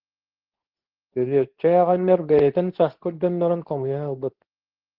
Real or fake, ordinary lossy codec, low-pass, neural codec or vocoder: fake; Opus, 16 kbps; 5.4 kHz; codec, 24 kHz, 0.9 kbps, WavTokenizer, medium speech release version 2